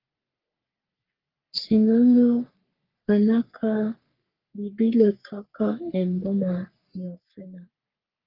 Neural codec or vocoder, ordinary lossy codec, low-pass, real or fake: codec, 44.1 kHz, 3.4 kbps, Pupu-Codec; Opus, 32 kbps; 5.4 kHz; fake